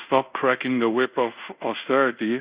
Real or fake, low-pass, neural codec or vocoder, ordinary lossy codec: fake; 3.6 kHz; codec, 24 kHz, 0.5 kbps, DualCodec; Opus, 64 kbps